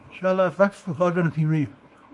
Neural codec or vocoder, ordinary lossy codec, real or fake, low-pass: codec, 24 kHz, 0.9 kbps, WavTokenizer, small release; MP3, 48 kbps; fake; 10.8 kHz